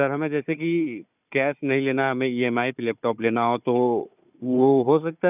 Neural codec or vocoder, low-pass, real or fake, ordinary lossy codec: codec, 16 kHz, 4 kbps, FunCodec, trained on Chinese and English, 50 frames a second; 3.6 kHz; fake; none